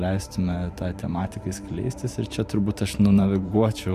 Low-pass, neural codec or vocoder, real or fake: 14.4 kHz; vocoder, 44.1 kHz, 128 mel bands every 512 samples, BigVGAN v2; fake